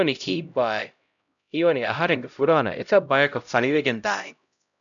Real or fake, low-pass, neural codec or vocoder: fake; 7.2 kHz; codec, 16 kHz, 0.5 kbps, X-Codec, HuBERT features, trained on LibriSpeech